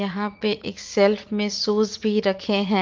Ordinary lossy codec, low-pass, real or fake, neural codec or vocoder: Opus, 24 kbps; 7.2 kHz; fake; vocoder, 44.1 kHz, 80 mel bands, Vocos